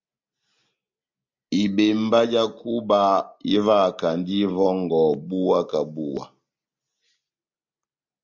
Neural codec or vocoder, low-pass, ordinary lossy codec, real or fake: none; 7.2 kHz; MP3, 64 kbps; real